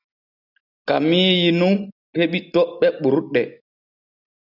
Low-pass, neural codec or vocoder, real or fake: 5.4 kHz; none; real